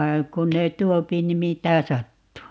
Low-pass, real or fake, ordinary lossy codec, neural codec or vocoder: none; real; none; none